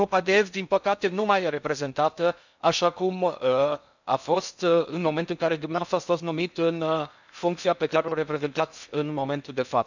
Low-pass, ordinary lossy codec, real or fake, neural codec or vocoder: 7.2 kHz; none; fake; codec, 16 kHz in and 24 kHz out, 0.6 kbps, FocalCodec, streaming, 4096 codes